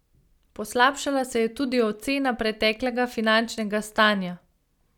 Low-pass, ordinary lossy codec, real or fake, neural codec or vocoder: 19.8 kHz; none; real; none